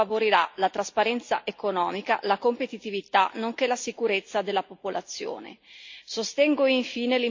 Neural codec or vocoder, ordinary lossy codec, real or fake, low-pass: none; MP3, 48 kbps; real; 7.2 kHz